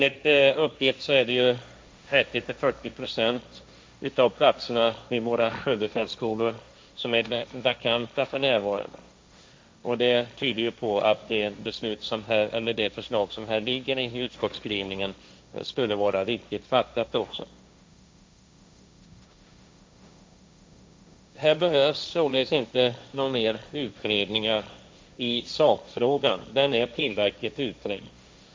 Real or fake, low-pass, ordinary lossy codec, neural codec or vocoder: fake; none; none; codec, 16 kHz, 1.1 kbps, Voila-Tokenizer